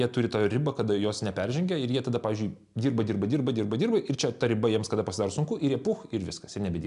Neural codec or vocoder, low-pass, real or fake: none; 10.8 kHz; real